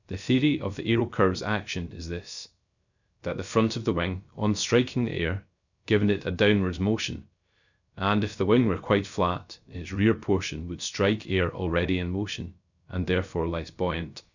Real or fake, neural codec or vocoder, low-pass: fake; codec, 16 kHz, 0.3 kbps, FocalCodec; 7.2 kHz